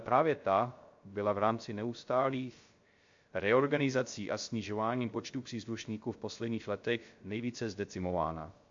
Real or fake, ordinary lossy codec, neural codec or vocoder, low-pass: fake; MP3, 48 kbps; codec, 16 kHz, 0.3 kbps, FocalCodec; 7.2 kHz